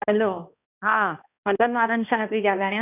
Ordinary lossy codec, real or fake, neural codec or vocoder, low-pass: none; fake; codec, 16 kHz, 1 kbps, X-Codec, HuBERT features, trained on balanced general audio; 3.6 kHz